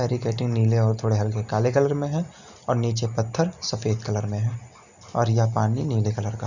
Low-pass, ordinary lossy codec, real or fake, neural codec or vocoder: 7.2 kHz; none; real; none